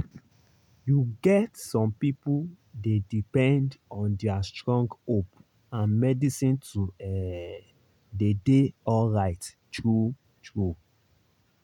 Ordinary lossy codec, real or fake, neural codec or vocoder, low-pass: none; real; none; 19.8 kHz